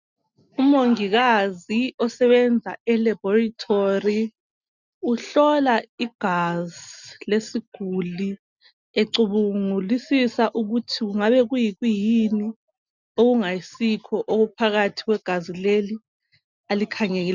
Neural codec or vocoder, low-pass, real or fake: none; 7.2 kHz; real